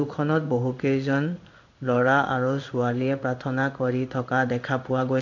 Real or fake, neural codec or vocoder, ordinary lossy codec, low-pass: fake; codec, 16 kHz in and 24 kHz out, 1 kbps, XY-Tokenizer; none; 7.2 kHz